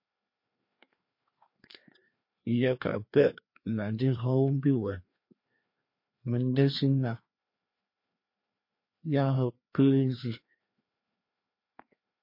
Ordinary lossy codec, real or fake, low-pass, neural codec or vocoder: MP3, 32 kbps; fake; 5.4 kHz; codec, 16 kHz, 2 kbps, FreqCodec, larger model